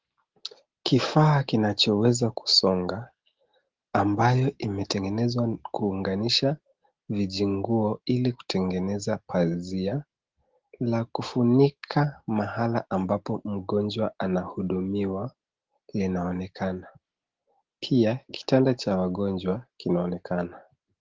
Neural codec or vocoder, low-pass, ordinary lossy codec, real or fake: none; 7.2 kHz; Opus, 16 kbps; real